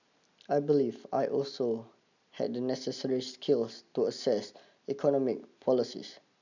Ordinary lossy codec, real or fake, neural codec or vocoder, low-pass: none; real; none; 7.2 kHz